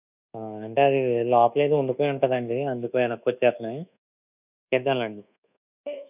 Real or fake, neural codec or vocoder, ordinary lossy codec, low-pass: fake; codec, 24 kHz, 1.2 kbps, DualCodec; none; 3.6 kHz